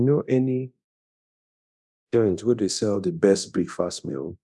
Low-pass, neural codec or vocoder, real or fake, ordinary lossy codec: none; codec, 24 kHz, 0.9 kbps, DualCodec; fake; none